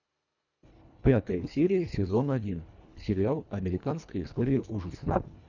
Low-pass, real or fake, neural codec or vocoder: 7.2 kHz; fake; codec, 24 kHz, 1.5 kbps, HILCodec